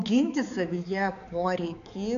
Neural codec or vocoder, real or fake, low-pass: codec, 16 kHz, 4 kbps, X-Codec, HuBERT features, trained on balanced general audio; fake; 7.2 kHz